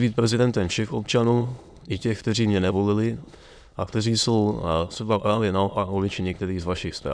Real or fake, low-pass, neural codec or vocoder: fake; 9.9 kHz; autoencoder, 22.05 kHz, a latent of 192 numbers a frame, VITS, trained on many speakers